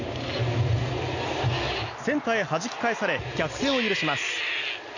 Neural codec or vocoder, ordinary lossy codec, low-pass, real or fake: none; none; 7.2 kHz; real